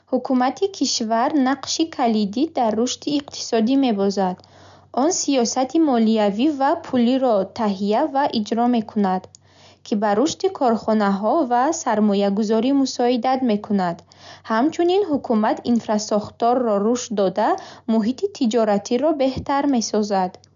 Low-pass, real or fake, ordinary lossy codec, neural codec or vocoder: 7.2 kHz; real; none; none